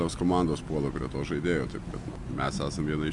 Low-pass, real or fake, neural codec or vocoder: 10.8 kHz; real; none